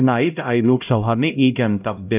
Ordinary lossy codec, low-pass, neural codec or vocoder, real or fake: none; 3.6 kHz; codec, 16 kHz, 0.5 kbps, X-Codec, HuBERT features, trained on LibriSpeech; fake